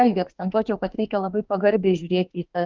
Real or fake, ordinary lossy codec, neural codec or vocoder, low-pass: fake; Opus, 24 kbps; autoencoder, 48 kHz, 32 numbers a frame, DAC-VAE, trained on Japanese speech; 7.2 kHz